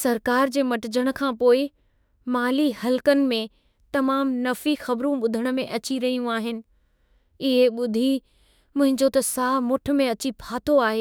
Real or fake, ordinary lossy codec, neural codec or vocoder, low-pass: fake; none; autoencoder, 48 kHz, 32 numbers a frame, DAC-VAE, trained on Japanese speech; none